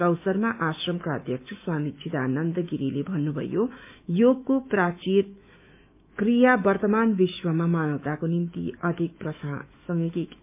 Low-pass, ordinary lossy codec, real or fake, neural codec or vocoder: 3.6 kHz; MP3, 32 kbps; fake; autoencoder, 48 kHz, 128 numbers a frame, DAC-VAE, trained on Japanese speech